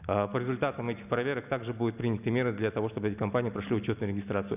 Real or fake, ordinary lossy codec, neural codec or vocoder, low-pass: real; none; none; 3.6 kHz